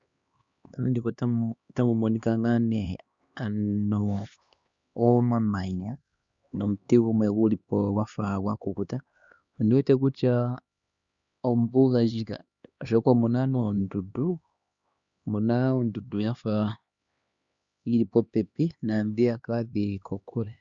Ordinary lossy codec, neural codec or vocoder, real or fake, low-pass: Opus, 64 kbps; codec, 16 kHz, 2 kbps, X-Codec, HuBERT features, trained on LibriSpeech; fake; 7.2 kHz